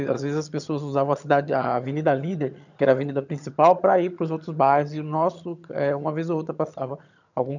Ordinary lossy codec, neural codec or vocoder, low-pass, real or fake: none; vocoder, 22.05 kHz, 80 mel bands, HiFi-GAN; 7.2 kHz; fake